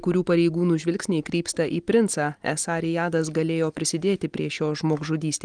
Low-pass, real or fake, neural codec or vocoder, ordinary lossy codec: 9.9 kHz; real; none; Opus, 24 kbps